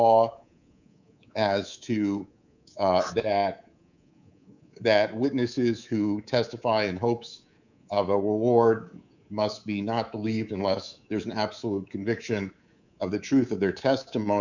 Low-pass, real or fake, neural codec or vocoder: 7.2 kHz; fake; codec, 24 kHz, 3.1 kbps, DualCodec